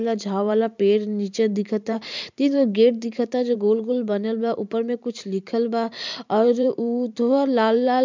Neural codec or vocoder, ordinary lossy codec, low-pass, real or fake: vocoder, 44.1 kHz, 128 mel bands every 512 samples, BigVGAN v2; MP3, 64 kbps; 7.2 kHz; fake